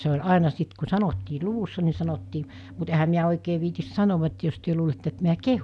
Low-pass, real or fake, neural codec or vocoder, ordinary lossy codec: none; real; none; none